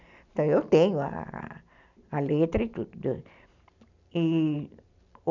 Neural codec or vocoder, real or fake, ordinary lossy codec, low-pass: none; real; none; 7.2 kHz